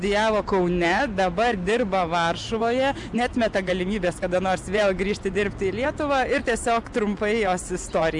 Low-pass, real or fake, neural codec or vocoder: 10.8 kHz; real; none